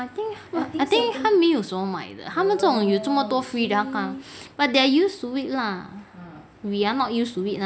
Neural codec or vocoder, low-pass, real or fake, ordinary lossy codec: none; none; real; none